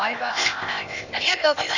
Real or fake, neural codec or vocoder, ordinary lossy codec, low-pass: fake; codec, 16 kHz, 0.8 kbps, ZipCodec; none; 7.2 kHz